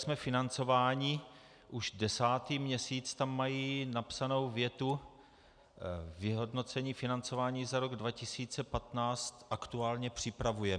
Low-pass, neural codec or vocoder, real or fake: 9.9 kHz; none; real